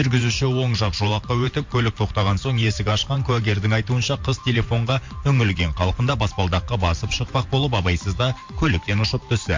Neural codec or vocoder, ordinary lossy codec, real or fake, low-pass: vocoder, 44.1 kHz, 128 mel bands every 512 samples, BigVGAN v2; MP3, 48 kbps; fake; 7.2 kHz